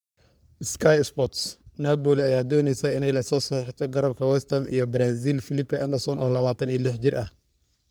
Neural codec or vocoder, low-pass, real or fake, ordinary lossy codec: codec, 44.1 kHz, 3.4 kbps, Pupu-Codec; none; fake; none